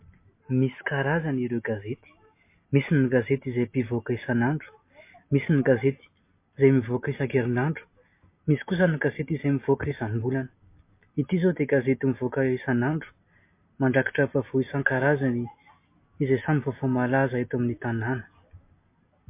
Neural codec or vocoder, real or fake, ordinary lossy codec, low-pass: none; real; MP3, 24 kbps; 3.6 kHz